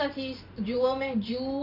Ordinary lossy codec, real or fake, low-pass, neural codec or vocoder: none; fake; 5.4 kHz; vocoder, 44.1 kHz, 128 mel bands every 512 samples, BigVGAN v2